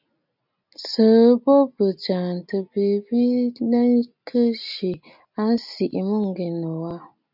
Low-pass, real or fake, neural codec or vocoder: 5.4 kHz; real; none